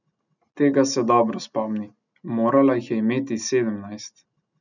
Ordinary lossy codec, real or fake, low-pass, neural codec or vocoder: none; real; 7.2 kHz; none